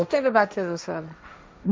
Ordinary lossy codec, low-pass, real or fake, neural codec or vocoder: none; none; fake; codec, 16 kHz, 1.1 kbps, Voila-Tokenizer